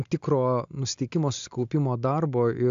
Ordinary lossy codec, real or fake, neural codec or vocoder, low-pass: AAC, 64 kbps; real; none; 7.2 kHz